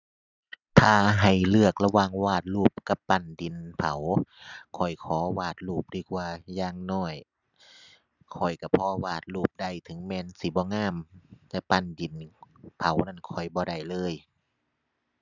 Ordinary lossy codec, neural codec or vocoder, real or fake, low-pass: none; none; real; 7.2 kHz